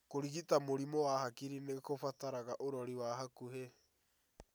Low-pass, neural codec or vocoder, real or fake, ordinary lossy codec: none; none; real; none